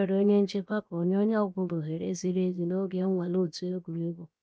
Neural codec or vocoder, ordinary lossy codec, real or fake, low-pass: codec, 16 kHz, 0.7 kbps, FocalCodec; none; fake; none